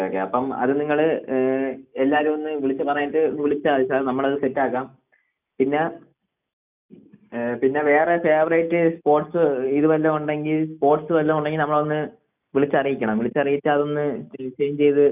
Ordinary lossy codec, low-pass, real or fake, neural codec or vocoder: none; 3.6 kHz; real; none